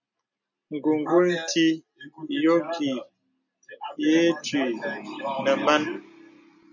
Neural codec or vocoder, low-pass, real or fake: none; 7.2 kHz; real